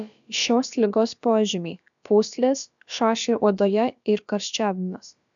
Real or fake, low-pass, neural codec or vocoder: fake; 7.2 kHz; codec, 16 kHz, about 1 kbps, DyCAST, with the encoder's durations